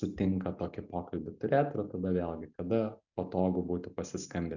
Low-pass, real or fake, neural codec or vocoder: 7.2 kHz; real; none